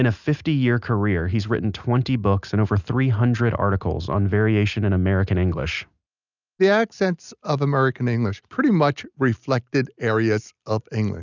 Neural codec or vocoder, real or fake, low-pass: none; real; 7.2 kHz